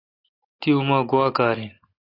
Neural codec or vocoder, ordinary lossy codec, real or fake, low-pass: none; AAC, 24 kbps; real; 5.4 kHz